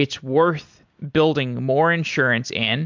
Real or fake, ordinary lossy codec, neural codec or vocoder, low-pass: real; MP3, 64 kbps; none; 7.2 kHz